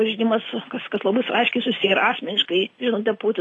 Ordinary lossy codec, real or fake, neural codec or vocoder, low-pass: AAC, 32 kbps; real; none; 10.8 kHz